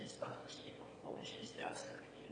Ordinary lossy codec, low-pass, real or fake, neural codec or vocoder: AAC, 32 kbps; 9.9 kHz; fake; autoencoder, 22.05 kHz, a latent of 192 numbers a frame, VITS, trained on one speaker